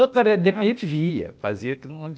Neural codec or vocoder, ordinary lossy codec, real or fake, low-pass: codec, 16 kHz, 0.8 kbps, ZipCodec; none; fake; none